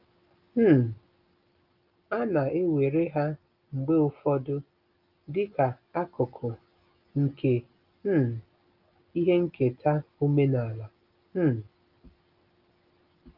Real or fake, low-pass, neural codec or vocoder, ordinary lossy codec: real; 5.4 kHz; none; Opus, 24 kbps